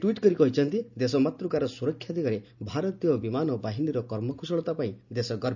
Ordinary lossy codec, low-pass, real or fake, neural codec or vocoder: none; 7.2 kHz; real; none